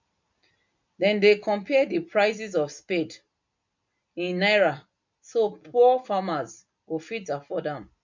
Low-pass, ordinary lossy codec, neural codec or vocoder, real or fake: 7.2 kHz; MP3, 64 kbps; none; real